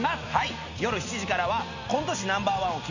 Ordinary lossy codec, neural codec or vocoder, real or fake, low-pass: none; none; real; 7.2 kHz